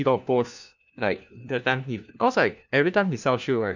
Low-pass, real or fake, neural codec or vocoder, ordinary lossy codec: 7.2 kHz; fake; codec, 16 kHz, 1 kbps, FunCodec, trained on LibriTTS, 50 frames a second; none